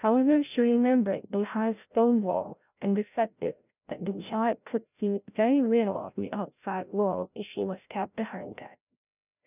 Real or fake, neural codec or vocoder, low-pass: fake; codec, 16 kHz, 0.5 kbps, FreqCodec, larger model; 3.6 kHz